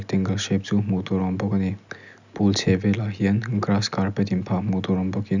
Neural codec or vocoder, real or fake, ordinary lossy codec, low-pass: none; real; none; 7.2 kHz